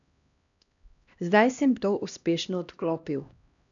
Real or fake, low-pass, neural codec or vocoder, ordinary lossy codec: fake; 7.2 kHz; codec, 16 kHz, 1 kbps, X-Codec, HuBERT features, trained on LibriSpeech; none